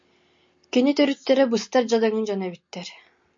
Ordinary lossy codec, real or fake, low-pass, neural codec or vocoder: MP3, 48 kbps; real; 7.2 kHz; none